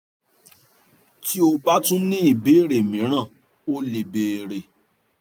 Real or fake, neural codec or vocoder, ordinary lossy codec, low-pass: real; none; none; none